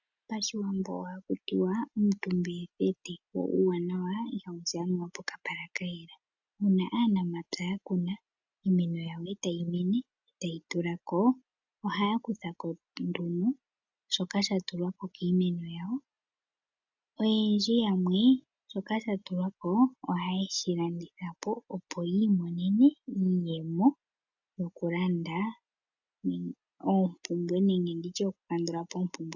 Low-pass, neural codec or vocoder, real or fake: 7.2 kHz; none; real